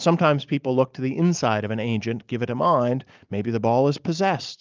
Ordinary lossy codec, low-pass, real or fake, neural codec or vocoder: Opus, 24 kbps; 7.2 kHz; real; none